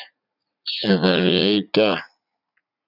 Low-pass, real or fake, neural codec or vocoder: 5.4 kHz; fake; vocoder, 44.1 kHz, 80 mel bands, Vocos